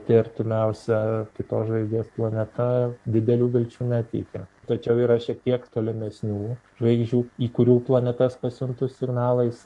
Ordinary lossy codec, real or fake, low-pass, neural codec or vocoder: AAC, 64 kbps; fake; 10.8 kHz; codec, 44.1 kHz, 7.8 kbps, Pupu-Codec